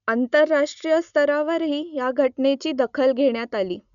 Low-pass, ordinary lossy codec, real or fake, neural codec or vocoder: 7.2 kHz; none; real; none